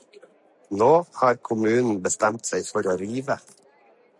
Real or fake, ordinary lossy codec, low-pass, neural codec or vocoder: real; MP3, 96 kbps; 10.8 kHz; none